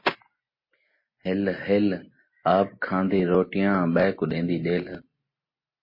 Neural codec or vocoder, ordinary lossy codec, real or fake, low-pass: none; MP3, 24 kbps; real; 5.4 kHz